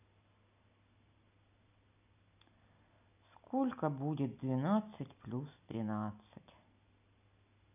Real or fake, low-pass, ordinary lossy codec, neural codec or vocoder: real; 3.6 kHz; none; none